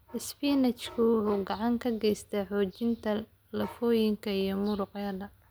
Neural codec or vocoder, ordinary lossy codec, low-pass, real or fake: none; none; none; real